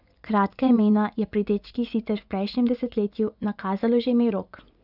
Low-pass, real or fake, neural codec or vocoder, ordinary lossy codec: 5.4 kHz; fake; vocoder, 24 kHz, 100 mel bands, Vocos; none